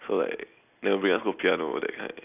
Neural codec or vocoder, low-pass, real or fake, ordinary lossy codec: none; 3.6 kHz; real; none